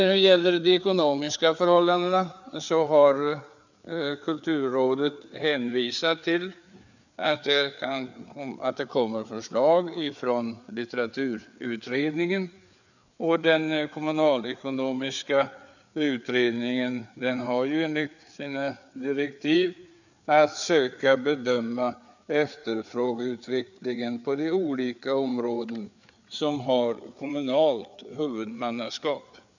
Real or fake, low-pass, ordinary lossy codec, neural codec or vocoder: fake; 7.2 kHz; none; codec, 16 kHz, 4 kbps, FreqCodec, larger model